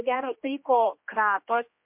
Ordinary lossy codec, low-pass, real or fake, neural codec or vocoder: MP3, 32 kbps; 3.6 kHz; fake; codec, 16 kHz, 1 kbps, X-Codec, HuBERT features, trained on general audio